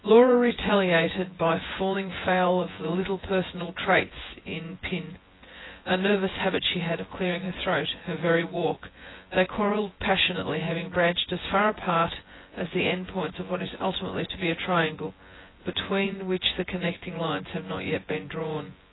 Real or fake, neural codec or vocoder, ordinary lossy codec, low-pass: fake; vocoder, 24 kHz, 100 mel bands, Vocos; AAC, 16 kbps; 7.2 kHz